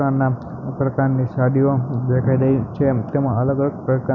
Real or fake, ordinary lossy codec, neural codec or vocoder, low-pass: real; none; none; 7.2 kHz